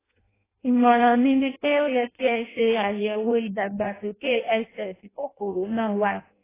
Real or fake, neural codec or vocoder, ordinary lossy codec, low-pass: fake; codec, 16 kHz in and 24 kHz out, 0.6 kbps, FireRedTTS-2 codec; AAC, 16 kbps; 3.6 kHz